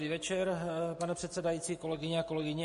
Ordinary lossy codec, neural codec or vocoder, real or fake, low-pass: MP3, 48 kbps; none; real; 14.4 kHz